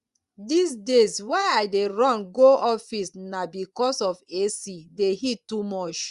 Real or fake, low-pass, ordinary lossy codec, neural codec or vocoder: fake; 10.8 kHz; Opus, 64 kbps; vocoder, 24 kHz, 100 mel bands, Vocos